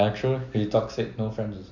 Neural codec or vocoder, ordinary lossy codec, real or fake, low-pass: none; none; real; 7.2 kHz